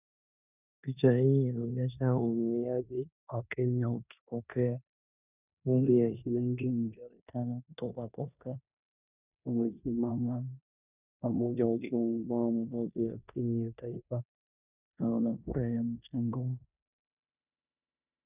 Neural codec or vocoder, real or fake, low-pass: codec, 16 kHz in and 24 kHz out, 0.9 kbps, LongCat-Audio-Codec, four codebook decoder; fake; 3.6 kHz